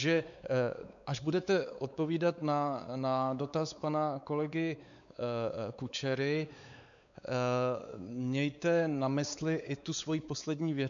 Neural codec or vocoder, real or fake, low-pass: codec, 16 kHz, 4 kbps, X-Codec, WavLM features, trained on Multilingual LibriSpeech; fake; 7.2 kHz